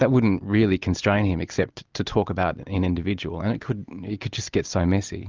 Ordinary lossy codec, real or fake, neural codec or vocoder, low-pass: Opus, 24 kbps; real; none; 7.2 kHz